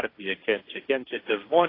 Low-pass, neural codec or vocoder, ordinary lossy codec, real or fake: 5.4 kHz; codec, 16 kHz, 1.1 kbps, Voila-Tokenizer; AAC, 24 kbps; fake